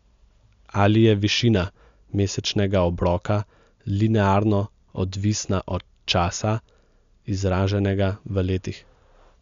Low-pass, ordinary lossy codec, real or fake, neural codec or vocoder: 7.2 kHz; MP3, 64 kbps; real; none